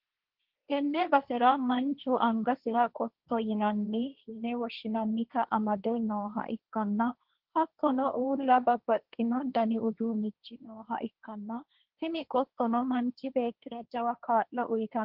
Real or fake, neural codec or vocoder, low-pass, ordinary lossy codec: fake; codec, 16 kHz, 1.1 kbps, Voila-Tokenizer; 5.4 kHz; Opus, 32 kbps